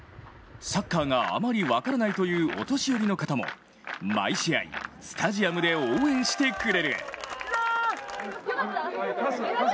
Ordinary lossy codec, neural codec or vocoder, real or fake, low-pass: none; none; real; none